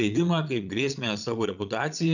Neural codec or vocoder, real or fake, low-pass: vocoder, 22.05 kHz, 80 mel bands, Vocos; fake; 7.2 kHz